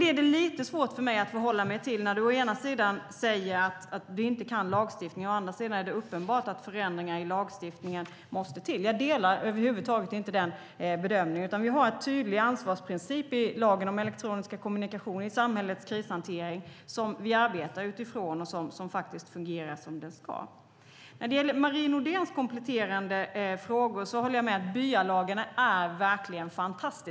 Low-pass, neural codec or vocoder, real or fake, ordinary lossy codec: none; none; real; none